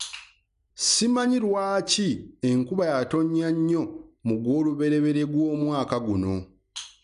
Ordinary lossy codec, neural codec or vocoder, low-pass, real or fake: none; none; 10.8 kHz; real